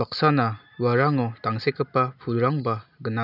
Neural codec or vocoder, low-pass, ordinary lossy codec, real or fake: none; 5.4 kHz; none; real